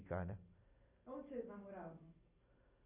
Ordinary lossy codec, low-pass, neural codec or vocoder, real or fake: none; 3.6 kHz; none; real